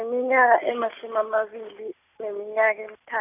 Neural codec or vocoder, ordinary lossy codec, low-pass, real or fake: none; none; 3.6 kHz; real